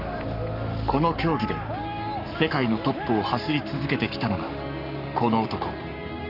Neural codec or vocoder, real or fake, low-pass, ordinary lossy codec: codec, 44.1 kHz, 7.8 kbps, Pupu-Codec; fake; 5.4 kHz; none